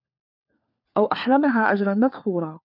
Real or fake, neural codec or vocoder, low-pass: fake; codec, 16 kHz, 4 kbps, FunCodec, trained on LibriTTS, 50 frames a second; 5.4 kHz